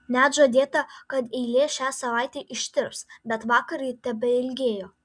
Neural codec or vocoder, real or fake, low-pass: none; real; 9.9 kHz